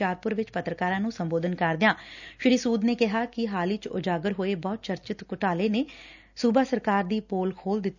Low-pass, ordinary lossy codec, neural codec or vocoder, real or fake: 7.2 kHz; none; none; real